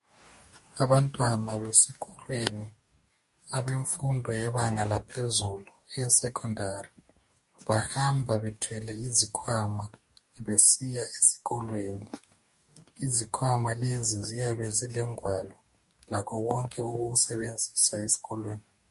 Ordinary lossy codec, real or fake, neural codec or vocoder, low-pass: MP3, 48 kbps; fake; codec, 44.1 kHz, 2.6 kbps, DAC; 14.4 kHz